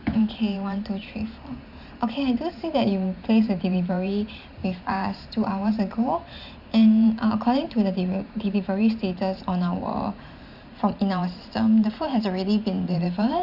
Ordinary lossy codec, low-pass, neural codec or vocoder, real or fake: none; 5.4 kHz; vocoder, 44.1 kHz, 128 mel bands every 512 samples, BigVGAN v2; fake